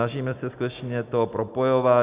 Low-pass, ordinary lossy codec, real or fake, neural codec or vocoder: 3.6 kHz; Opus, 64 kbps; real; none